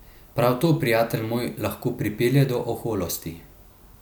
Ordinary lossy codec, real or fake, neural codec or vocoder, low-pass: none; real; none; none